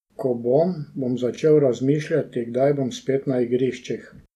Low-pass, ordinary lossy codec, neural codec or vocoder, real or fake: 14.4 kHz; none; none; real